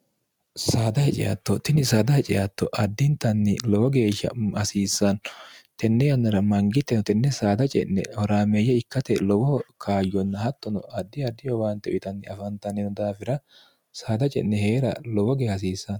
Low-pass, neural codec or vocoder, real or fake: 19.8 kHz; none; real